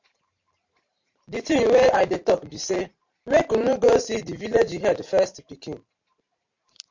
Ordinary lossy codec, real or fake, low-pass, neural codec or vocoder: MP3, 48 kbps; fake; 7.2 kHz; vocoder, 44.1 kHz, 128 mel bands every 512 samples, BigVGAN v2